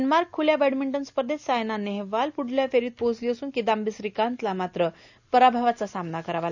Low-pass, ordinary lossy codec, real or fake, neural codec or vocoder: 7.2 kHz; none; real; none